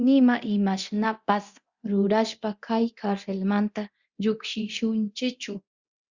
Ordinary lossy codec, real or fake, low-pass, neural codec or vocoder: Opus, 64 kbps; fake; 7.2 kHz; codec, 24 kHz, 0.9 kbps, DualCodec